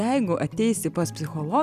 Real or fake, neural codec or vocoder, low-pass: real; none; 14.4 kHz